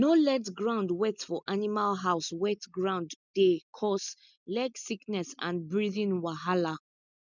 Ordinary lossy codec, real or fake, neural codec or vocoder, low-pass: none; real; none; 7.2 kHz